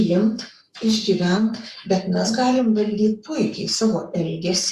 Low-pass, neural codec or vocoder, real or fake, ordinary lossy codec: 14.4 kHz; codec, 44.1 kHz, 7.8 kbps, Pupu-Codec; fake; Opus, 64 kbps